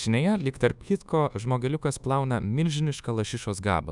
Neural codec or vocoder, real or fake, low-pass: codec, 24 kHz, 1.2 kbps, DualCodec; fake; 10.8 kHz